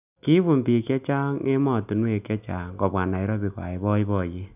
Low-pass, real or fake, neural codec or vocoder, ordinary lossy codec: 3.6 kHz; real; none; none